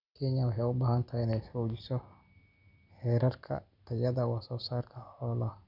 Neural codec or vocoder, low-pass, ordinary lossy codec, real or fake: none; 5.4 kHz; none; real